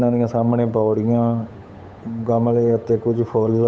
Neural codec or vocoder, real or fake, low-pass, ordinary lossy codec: codec, 16 kHz, 8 kbps, FunCodec, trained on Chinese and English, 25 frames a second; fake; none; none